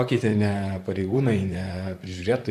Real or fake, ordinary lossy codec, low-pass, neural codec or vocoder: fake; AAC, 96 kbps; 14.4 kHz; vocoder, 44.1 kHz, 128 mel bands, Pupu-Vocoder